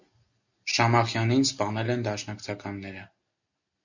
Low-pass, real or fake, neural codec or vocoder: 7.2 kHz; real; none